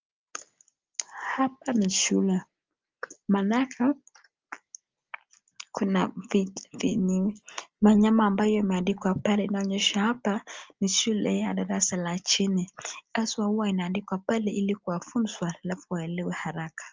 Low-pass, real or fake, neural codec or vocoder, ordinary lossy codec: 7.2 kHz; real; none; Opus, 24 kbps